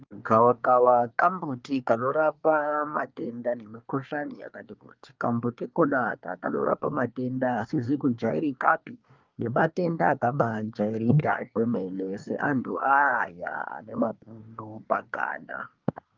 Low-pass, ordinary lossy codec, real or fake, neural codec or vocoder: 7.2 kHz; Opus, 24 kbps; fake; codec, 24 kHz, 1 kbps, SNAC